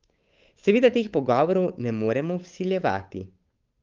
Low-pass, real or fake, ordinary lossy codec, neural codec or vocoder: 7.2 kHz; fake; Opus, 32 kbps; codec, 16 kHz, 8 kbps, FunCodec, trained on Chinese and English, 25 frames a second